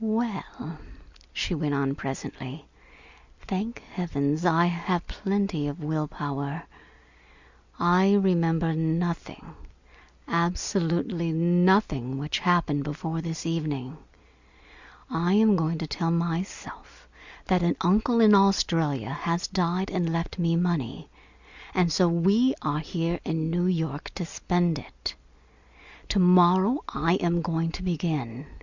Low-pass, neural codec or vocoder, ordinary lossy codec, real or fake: 7.2 kHz; none; Opus, 64 kbps; real